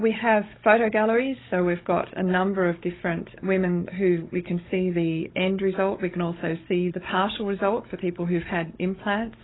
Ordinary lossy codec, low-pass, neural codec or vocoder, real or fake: AAC, 16 kbps; 7.2 kHz; codec, 16 kHz, 16 kbps, FunCodec, trained on Chinese and English, 50 frames a second; fake